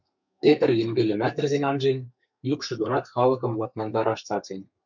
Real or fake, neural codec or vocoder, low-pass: fake; codec, 32 kHz, 1.9 kbps, SNAC; 7.2 kHz